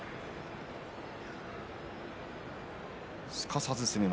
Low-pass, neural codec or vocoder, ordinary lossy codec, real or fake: none; none; none; real